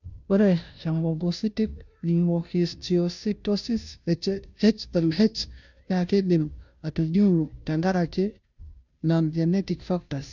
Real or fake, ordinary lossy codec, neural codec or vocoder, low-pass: fake; none; codec, 16 kHz, 0.5 kbps, FunCodec, trained on Chinese and English, 25 frames a second; 7.2 kHz